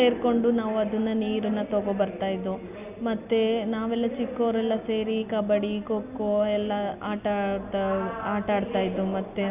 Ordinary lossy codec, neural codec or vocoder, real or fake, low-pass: none; none; real; 3.6 kHz